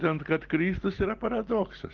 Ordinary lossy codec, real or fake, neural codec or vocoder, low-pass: Opus, 16 kbps; real; none; 7.2 kHz